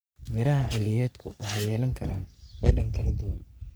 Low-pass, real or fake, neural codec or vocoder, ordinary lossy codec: none; fake; codec, 44.1 kHz, 3.4 kbps, Pupu-Codec; none